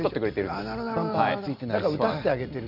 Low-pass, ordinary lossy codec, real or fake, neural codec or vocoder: 5.4 kHz; none; real; none